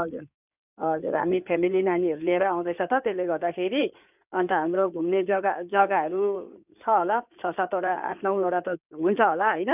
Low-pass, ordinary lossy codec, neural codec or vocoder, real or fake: 3.6 kHz; none; codec, 16 kHz in and 24 kHz out, 2.2 kbps, FireRedTTS-2 codec; fake